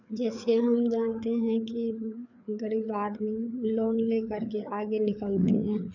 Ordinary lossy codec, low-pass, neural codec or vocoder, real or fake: none; 7.2 kHz; codec, 16 kHz, 4 kbps, FreqCodec, larger model; fake